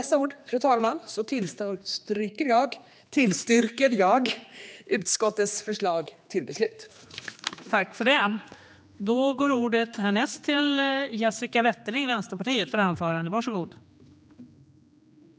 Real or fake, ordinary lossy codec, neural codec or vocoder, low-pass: fake; none; codec, 16 kHz, 2 kbps, X-Codec, HuBERT features, trained on general audio; none